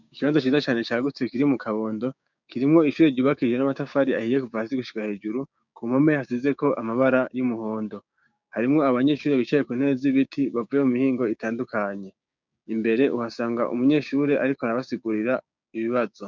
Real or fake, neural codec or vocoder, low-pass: fake; codec, 16 kHz, 6 kbps, DAC; 7.2 kHz